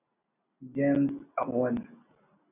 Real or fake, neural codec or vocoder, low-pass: real; none; 3.6 kHz